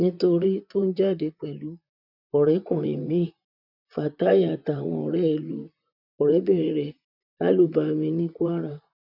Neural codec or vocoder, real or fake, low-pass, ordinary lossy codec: vocoder, 44.1 kHz, 128 mel bands, Pupu-Vocoder; fake; 5.4 kHz; none